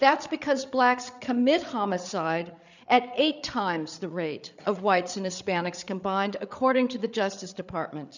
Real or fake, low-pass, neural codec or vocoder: fake; 7.2 kHz; codec, 16 kHz, 8 kbps, FreqCodec, larger model